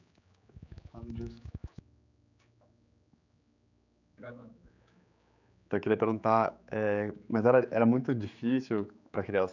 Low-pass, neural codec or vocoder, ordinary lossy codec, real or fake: 7.2 kHz; codec, 16 kHz, 4 kbps, X-Codec, HuBERT features, trained on general audio; none; fake